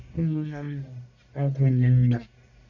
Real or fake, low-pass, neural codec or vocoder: fake; 7.2 kHz; codec, 44.1 kHz, 1.7 kbps, Pupu-Codec